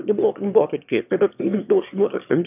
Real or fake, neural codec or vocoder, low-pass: fake; autoencoder, 22.05 kHz, a latent of 192 numbers a frame, VITS, trained on one speaker; 3.6 kHz